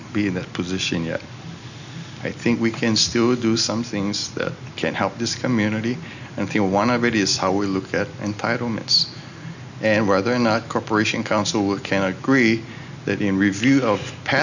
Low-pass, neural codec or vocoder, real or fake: 7.2 kHz; none; real